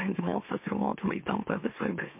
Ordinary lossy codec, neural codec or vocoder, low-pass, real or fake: MP3, 24 kbps; autoencoder, 44.1 kHz, a latent of 192 numbers a frame, MeloTTS; 3.6 kHz; fake